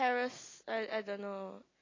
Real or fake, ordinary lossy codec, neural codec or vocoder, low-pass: real; AAC, 32 kbps; none; 7.2 kHz